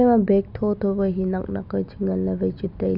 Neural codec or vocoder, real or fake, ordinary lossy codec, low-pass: none; real; none; 5.4 kHz